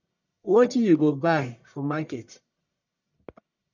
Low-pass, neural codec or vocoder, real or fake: 7.2 kHz; codec, 44.1 kHz, 1.7 kbps, Pupu-Codec; fake